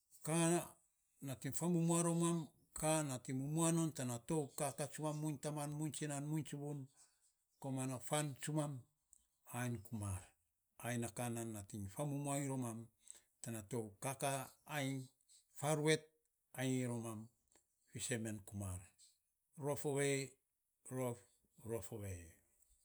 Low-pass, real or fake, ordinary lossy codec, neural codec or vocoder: none; real; none; none